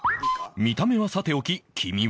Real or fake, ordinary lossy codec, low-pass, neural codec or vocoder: real; none; none; none